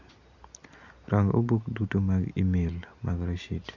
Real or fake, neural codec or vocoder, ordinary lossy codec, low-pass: real; none; none; 7.2 kHz